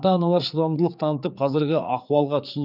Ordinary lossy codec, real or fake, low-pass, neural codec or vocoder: none; fake; 5.4 kHz; codec, 16 kHz, 4 kbps, X-Codec, HuBERT features, trained on general audio